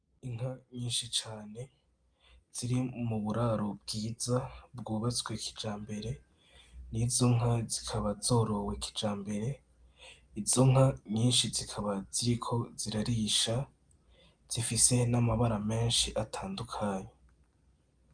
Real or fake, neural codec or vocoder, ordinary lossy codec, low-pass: real; none; Opus, 32 kbps; 9.9 kHz